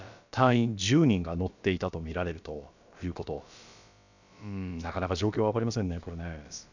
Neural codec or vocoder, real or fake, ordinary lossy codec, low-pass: codec, 16 kHz, about 1 kbps, DyCAST, with the encoder's durations; fake; none; 7.2 kHz